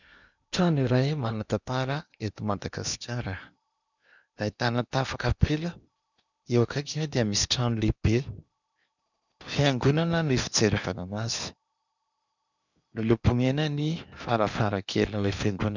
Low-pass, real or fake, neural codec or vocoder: 7.2 kHz; fake; codec, 16 kHz in and 24 kHz out, 0.8 kbps, FocalCodec, streaming, 65536 codes